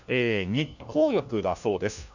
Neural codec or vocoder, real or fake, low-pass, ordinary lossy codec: codec, 16 kHz, 1 kbps, FunCodec, trained on LibriTTS, 50 frames a second; fake; 7.2 kHz; none